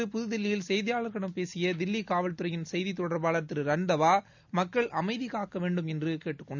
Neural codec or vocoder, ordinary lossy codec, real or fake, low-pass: none; none; real; 7.2 kHz